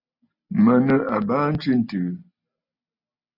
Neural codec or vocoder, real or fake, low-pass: none; real; 5.4 kHz